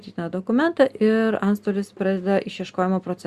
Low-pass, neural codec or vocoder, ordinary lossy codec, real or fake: 14.4 kHz; none; Opus, 64 kbps; real